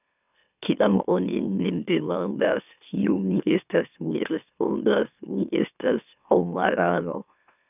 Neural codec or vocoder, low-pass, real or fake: autoencoder, 44.1 kHz, a latent of 192 numbers a frame, MeloTTS; 3.6 kHz; fake